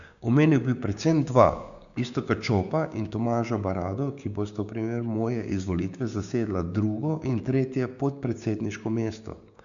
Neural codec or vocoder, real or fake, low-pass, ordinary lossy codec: codec, 16 kHz, 6 kbps, DAC; fake; 7.2 kHz; none